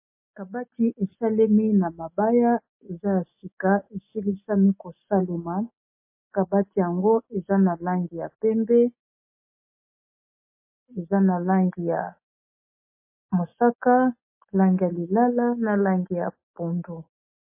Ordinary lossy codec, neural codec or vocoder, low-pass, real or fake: MP3, 24 kbps; none; 3.6 kHz; real